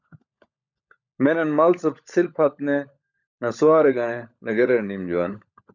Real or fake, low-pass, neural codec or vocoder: fake; 7.2 kHz; codec, 16 kHz, 16 kbps, FunCodec, trained on LibriTTS, 50 frames a second